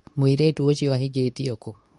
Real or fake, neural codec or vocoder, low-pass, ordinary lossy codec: fake; codec, 24 kHz, 0.9 kbps, WavTokenizer, medium speech release version 2; 10.8 kHz; MP3, 96 kbps